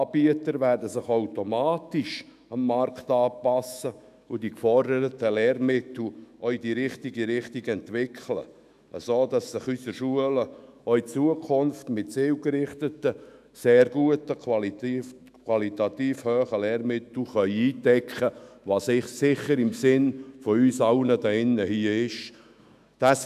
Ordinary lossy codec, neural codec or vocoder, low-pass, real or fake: none; autoencoder, 48 kHz, 128 numbers a frame, DAC-VAE, trained on Japanese speech; 14.4 kHz; fake